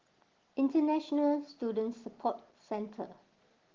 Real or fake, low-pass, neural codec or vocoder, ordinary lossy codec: real; 7.2 kHz; none; Opus, 16 kbps